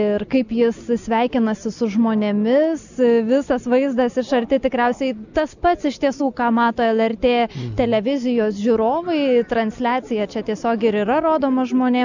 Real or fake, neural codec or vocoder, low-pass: real; none; 7.2 kHz